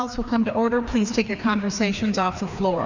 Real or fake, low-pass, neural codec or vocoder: fake; 7.2 kHz; codec, 16 kHz, 2 kbps, FreqCodec, larger model